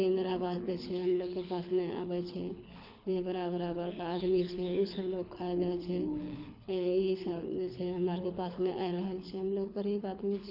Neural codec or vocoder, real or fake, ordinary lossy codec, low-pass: codec, 24 kHz, 6 kbps, HILCodec; fake; AAC, 48 kbps; 5.4 kHz